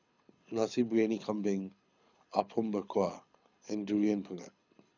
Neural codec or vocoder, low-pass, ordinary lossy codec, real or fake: codec, 24 kHz, 6 kbps, HILCodec; 7.2 kHz; none; fake